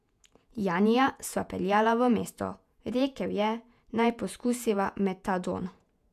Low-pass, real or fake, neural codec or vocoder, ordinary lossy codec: 14.4 kHz; fake; vocoder, 48 kHz, 128 mel bands, Vocos; none